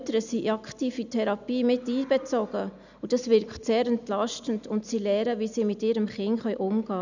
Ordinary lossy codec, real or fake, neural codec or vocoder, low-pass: none; real; none; 7.2 kHz